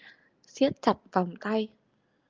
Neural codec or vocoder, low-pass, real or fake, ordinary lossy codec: none; 7.2 kHz; real; Opus, 32 kbps